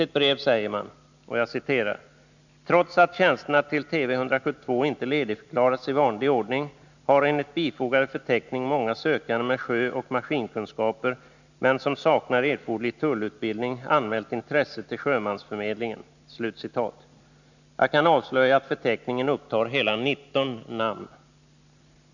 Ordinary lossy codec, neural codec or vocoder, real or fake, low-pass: none; none; real; 7.2 kHz